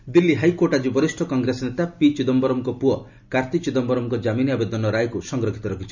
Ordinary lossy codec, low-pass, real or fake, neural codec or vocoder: none; 7.2 kHz; real; none